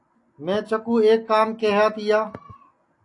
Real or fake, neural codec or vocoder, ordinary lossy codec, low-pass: real; none; AAC, 48 kbps; 10.8 kHz